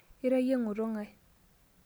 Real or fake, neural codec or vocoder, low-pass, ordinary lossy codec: real; none; none; none